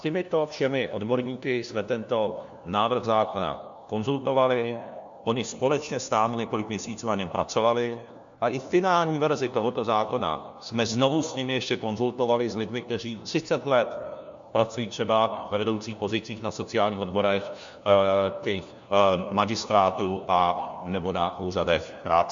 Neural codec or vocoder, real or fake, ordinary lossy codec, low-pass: codec, 16 kHz, 1 kbps, FunCodec, trained on LibriTTS, 50 frames a second; fake; MP3, 64 kbps; 7.2 kHz